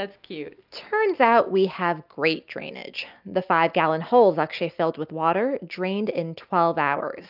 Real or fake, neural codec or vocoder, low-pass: real; none; 5.4 kHz